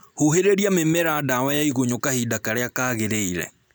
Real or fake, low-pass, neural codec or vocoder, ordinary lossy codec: real; none; none; none